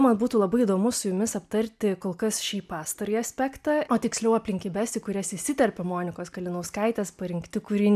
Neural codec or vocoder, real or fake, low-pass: none; real; 14.4 kHz